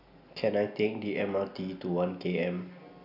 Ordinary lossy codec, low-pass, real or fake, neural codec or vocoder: none; 5.4 kHz; real; none